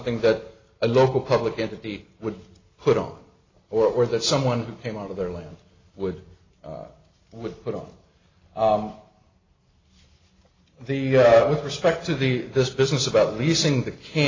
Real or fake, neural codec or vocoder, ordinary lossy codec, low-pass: real; none; AAC, 32 kbps; 7.2 kHz